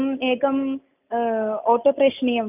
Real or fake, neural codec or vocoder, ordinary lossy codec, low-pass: real; none; none; 3.6 kHz